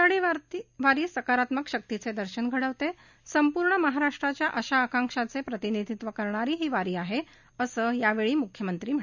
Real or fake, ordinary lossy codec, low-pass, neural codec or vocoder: real; none; 7.2 kHz; none